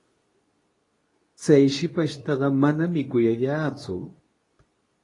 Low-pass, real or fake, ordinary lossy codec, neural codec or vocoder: 10.8 kHz; fake; AAC, 32 kbps; codec, 24 kHz, 0.9 kbps, WavTokenizer, medium speech release version 2